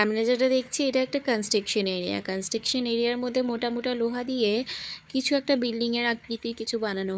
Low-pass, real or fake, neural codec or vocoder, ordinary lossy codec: none; fake; codec, 16 kHz, 4 kbps, FunCodec, trained on Chinese and English, 50 frames a second; none